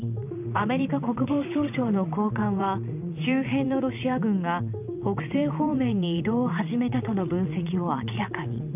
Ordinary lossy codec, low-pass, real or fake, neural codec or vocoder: none; 3.6 kHz; fake; vocoder, 22.05 kHz, 80 mel bands, WaveNeXt